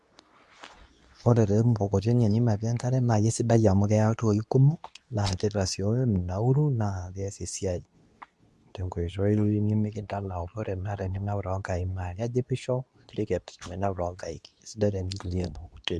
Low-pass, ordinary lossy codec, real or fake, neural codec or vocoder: none; none; fake; codec, 24 kHz, 0.9 kbps, WavTokenizer, medium speech release version 2